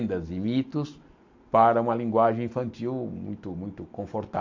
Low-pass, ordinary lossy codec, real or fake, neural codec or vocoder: 7.2 kHz; AAC, 48 kbps; real; none